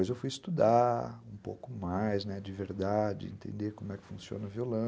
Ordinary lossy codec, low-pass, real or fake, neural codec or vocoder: none; none; real; none